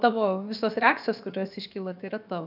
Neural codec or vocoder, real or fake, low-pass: codec, 16 kHz, about 1 kbps, DyCAST, with the encoder's durations; fake; 5.4 kHz